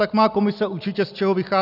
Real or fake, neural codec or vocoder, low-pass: real; none; 5.4 kHz